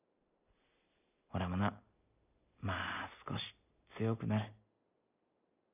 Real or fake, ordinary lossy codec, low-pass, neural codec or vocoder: real; none; 3.6 kHz; none